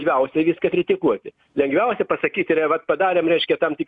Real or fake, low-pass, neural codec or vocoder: real; 10.8 kHz; none